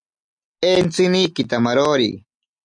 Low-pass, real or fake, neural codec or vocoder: 9.9 kHz; real; none